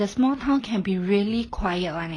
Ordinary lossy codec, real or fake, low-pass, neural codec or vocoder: AAC, 32 kbps; real; 9.9 kHz; none